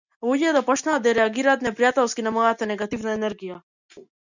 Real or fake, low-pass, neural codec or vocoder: real; 7.2 kHz; none